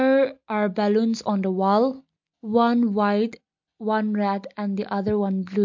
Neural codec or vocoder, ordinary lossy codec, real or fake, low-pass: none; MP3, 48 kbps; real; 7.2 kHz